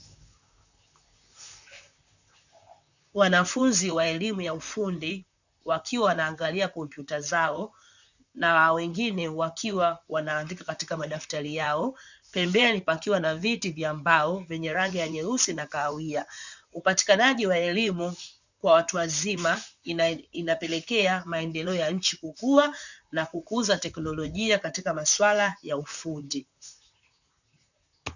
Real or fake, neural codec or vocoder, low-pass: fake; vocoder, 44.1 kHz, 128 mel bands, Pupu-Vocoder; 7.2 kHz